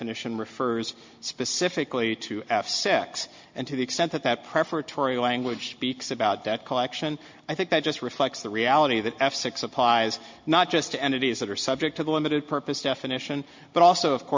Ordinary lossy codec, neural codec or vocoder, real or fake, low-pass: MP3, 48 kbps; none; real; 7.2 kHz